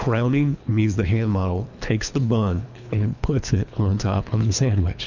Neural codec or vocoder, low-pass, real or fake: codec, 24 kHz, 3 kbps, HILCodec; 7.2 kHz; fake